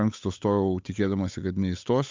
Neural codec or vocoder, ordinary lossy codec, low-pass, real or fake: codec, 16 kHz, 8 kbps, FunCodec, trained on Chinese and English, 25 frames a second; MP3, 64 kbps; 7.2 kHz; fake